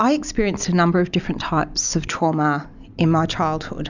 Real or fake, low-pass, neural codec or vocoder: real; 7.2 kHz; none